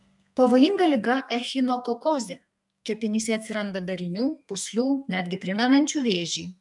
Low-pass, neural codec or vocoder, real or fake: 10.8 kHz; codec, 32 kHz, 1.9 kbps, SNAC; fake